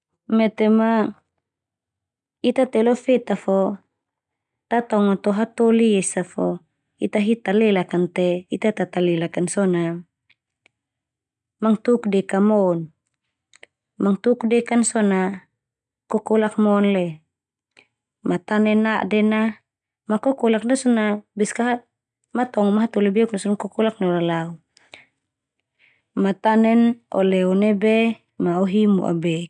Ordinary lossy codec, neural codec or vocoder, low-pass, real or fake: none; none; 9.9 kHz; real